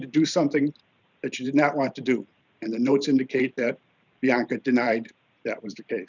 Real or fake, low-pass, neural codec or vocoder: fake; 7.2 kHz; vocoder, 44.1 kHz, 128 mel bands every 512 samples, BigVGAN v2